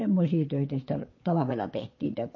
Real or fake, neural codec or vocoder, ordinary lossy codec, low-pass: fake; vocoder, 22.05 kHz, 80 mel bands, Vocos; MP3, 32 kbps; 7.2 kHz